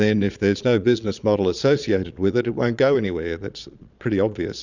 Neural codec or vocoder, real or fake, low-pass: vocoder, 22.05 kHz, 80 mel bands, Vocos; fake; 7.2 kHz